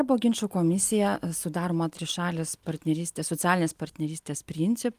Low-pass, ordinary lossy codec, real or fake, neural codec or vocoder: 14.4 kHz; Opus, 32 kbps; real; none